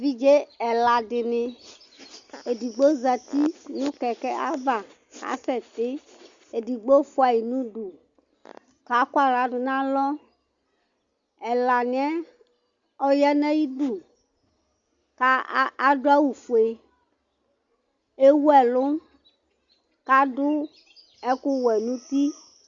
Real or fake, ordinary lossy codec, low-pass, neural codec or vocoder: real; Opus, 64 kbps; 7.2 kHz; none